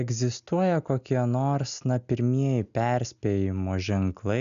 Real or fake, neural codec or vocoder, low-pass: real; none; 7.2 kHz